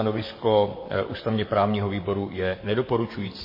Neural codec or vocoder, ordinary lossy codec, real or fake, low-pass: vocoder, 44.1 kHz, 128 mel bands, Pupu-Vocoder; MP3, 24 kbps; fake; 5.4 kHz